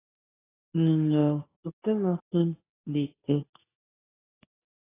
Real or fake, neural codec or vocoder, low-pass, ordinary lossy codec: fake; codec, 24 kHz, 0.9 kbps, WavTokenizer, medium speech release version 2; 3.6 kHz; AAC, 16 kbps